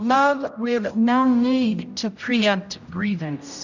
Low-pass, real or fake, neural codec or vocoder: 7.2 kHz; fake; codec, 16 kHz, 0.5 kbps, X-Codec, HuBERT features, trained on general audio